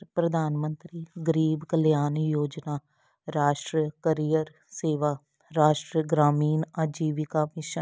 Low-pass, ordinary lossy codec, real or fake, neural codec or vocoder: none; none; real; none